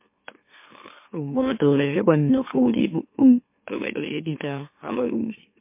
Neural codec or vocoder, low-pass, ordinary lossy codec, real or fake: autoencoder, 44.1 kHz, a latent of 192 numbers a frame, MeloTTS; 3.6 kHz; MP3, 24 kbps; fake